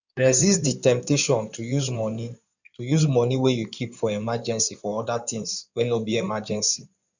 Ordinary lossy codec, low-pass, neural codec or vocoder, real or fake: none; 7.2 kHz; codec, 16 kHz in and 24 kHz out, 2.2 kbps, FireRedTTS-2 codec; fake